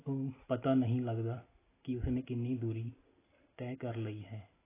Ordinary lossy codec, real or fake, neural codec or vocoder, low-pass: AAC, 16 kbps; real; none; 3.6 kHz